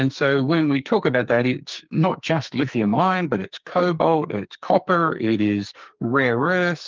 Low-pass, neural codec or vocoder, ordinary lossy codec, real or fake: 7.2 kHz; codec, 44.1 kHz, 2.6 kbps, SNAC; Opus, 24 kbps; fake